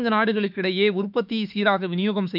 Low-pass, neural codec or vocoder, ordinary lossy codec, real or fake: 5.4 kHz; autoencoder, 48 kHz, 32 numbers a frame, DAC-VAE, trained on Japanese speech; none; fake